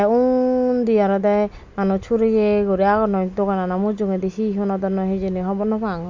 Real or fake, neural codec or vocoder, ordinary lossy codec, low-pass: real; none; MP3, 64 kbps; 7.2 kHz